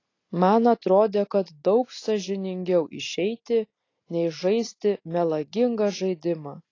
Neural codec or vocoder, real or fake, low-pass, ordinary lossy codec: none; real; 7.2 kHz; AAC, 32 kbps